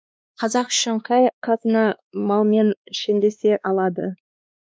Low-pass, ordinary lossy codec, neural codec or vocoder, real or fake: none; none; codec, 16 kHz, 2 kbps, X-Codec, WavLM features, trained on Multilingual LibriSpeech; fake